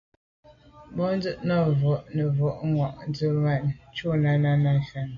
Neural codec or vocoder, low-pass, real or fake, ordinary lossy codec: none; 7.2 kHz; real; AAC, 48 kbps